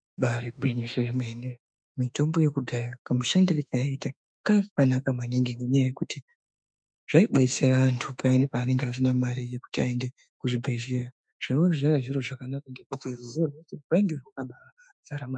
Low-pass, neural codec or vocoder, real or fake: 9.9 kHz; autoencoder, 48 kHz, 32 numbers a frame, DAC-VAE, trained on Japanese speech; fake